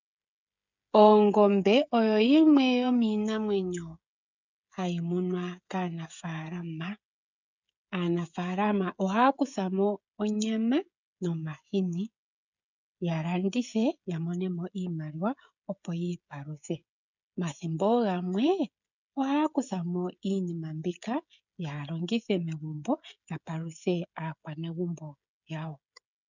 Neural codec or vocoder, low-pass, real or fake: codec, 16 kHz, 16 kbps, FreqCodec, smaller model; 7.2 kHz; fake